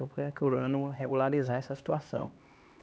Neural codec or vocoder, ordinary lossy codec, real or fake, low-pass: codec, 16 kHz, 2 kbps, X-Codec, HuBERT features, trained on LibriSpeech; none; fake; none